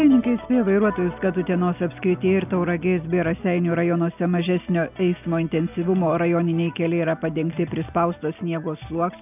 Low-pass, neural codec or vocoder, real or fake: 3.6 kHz; none; real